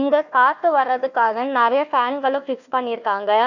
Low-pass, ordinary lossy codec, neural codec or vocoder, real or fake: 7.2 kHz; none; codec, 16 kHz, 1 kbps, FunCodec, trained on Chinese and English, 50 frames a second; fake